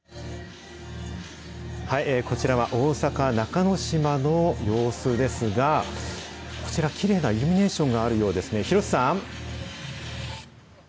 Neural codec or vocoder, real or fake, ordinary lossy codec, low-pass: none; real; none; none